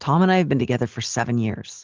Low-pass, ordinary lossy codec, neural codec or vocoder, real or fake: 7.2 kHz; Opus, 16 kbps; none; real